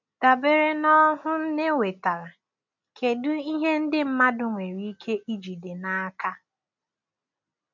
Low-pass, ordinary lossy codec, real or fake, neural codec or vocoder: 7.2 kHz; MP3, 64 kbps; real; none